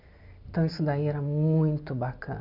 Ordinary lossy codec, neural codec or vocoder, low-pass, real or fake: none; none; 5.4 kHz; real